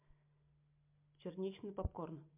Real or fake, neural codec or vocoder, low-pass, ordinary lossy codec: real; none; 3.6 kHz; MP3, 32 kbps